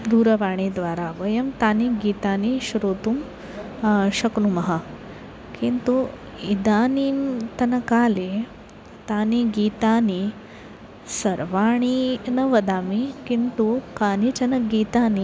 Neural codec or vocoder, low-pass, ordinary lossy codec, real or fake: none; none; none; real